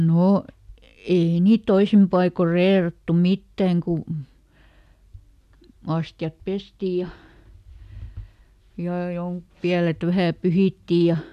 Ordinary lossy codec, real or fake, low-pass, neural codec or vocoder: none; real; 14.4 kHz; none